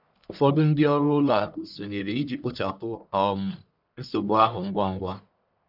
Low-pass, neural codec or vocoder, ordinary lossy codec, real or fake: 5.4 kHz; codec, 44.1 kHz, 1.7 kbps, Pupu-Codec; none; fake